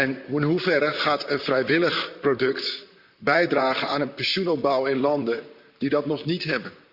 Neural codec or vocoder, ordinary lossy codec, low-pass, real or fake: vocoder, 44.1 kHz, 128 mel bands, Pupu-Vocoder; Opus, 64 kbps; 5.4 kHz; fake